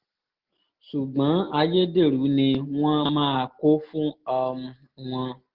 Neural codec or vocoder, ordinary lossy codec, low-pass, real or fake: none; Opus, 16 kbps; 5.4 kHz; real